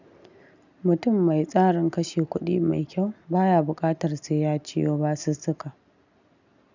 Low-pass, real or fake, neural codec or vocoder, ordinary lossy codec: 7.2 kHz; real; none; none